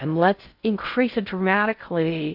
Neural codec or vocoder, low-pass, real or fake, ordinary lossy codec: codec, 16 kHz in and 24 kHz out, 0.6 kbps, FocalCodec, streaming, 2048 codes; 5.4 kHz; fake; AAC, 48 kbps